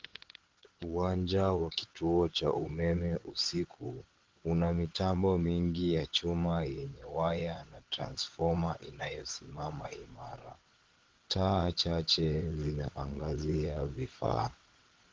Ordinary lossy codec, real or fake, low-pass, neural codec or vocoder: Opus, 16 kbps; fake; 7.2 kHz; vocoder, 22.05 kHz, 80 mel bands, WaveNeXt